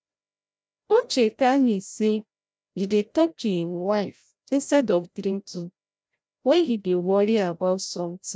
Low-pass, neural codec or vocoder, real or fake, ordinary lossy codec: none; codec, 16 kHz, 0.5 kbps, FreqCodec, larger model; fake; none